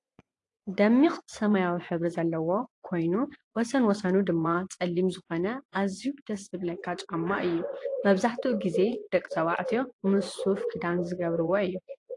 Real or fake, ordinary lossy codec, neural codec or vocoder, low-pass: fake; AAC, 48 kbps; vocoder, 24 kHz, 100 mel bands, Vocos; 10.8 kHz